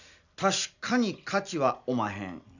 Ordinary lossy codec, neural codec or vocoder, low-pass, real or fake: none; none; 7.2 kHz; real